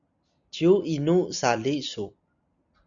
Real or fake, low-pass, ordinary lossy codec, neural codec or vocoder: real; 7.2 kHz; MP3, 96 kbps; none